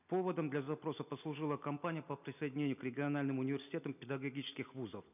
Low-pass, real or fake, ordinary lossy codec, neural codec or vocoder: 3.6 kHz; real; none; none